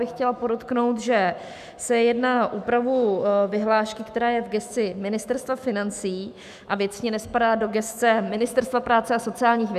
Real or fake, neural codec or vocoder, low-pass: fake; autoencoder, 48 kHz, 128 numbers a frame, DAC-VAE, trained on Japanese speech; 14.4 kHz